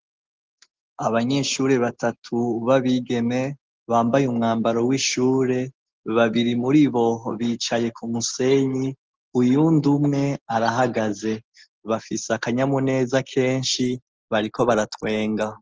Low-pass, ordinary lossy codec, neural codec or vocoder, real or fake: 7.2 kHz; Opus, 16 kbps; none; real